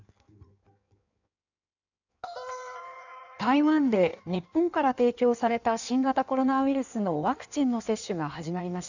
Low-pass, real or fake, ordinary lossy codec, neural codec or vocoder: 7.2 kHz; fake; none; codec, 16 kHz in and 24 kHz out, 1.1 kbps, FireRedTTS-2 codec